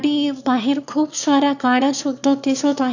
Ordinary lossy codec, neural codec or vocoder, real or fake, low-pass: none; autoencoder, 22.05 kHz, a latent of 192 numbers a frame, VITS, trained on one speaker; fake; 7.2 kHz